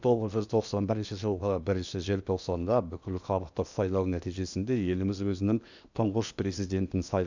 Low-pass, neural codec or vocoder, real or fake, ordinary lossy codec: 7.2 kHz; codec, 16 kHz in and 24 kHz out, 0.8 kbps, FocalCodec, streaming, 65536 codes; fake; none